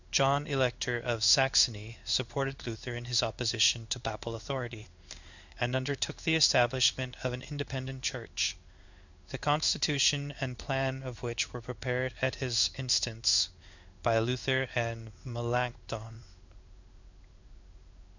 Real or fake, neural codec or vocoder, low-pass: fake; codec, 16 kHz in and 24 kHz out, 1 kbps, XY-Tokenizer; 7.2 kHz